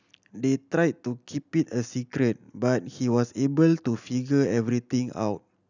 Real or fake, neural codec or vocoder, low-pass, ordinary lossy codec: real; none; 7.2 kHz; none